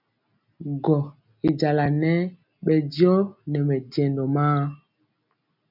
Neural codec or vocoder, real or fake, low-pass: none; real; 5.4 kHz